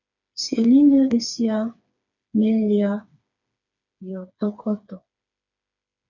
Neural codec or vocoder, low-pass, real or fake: codec, 16 kHz, 4 kbps, FreqCodec, smaller model; 7.2 kHz; fake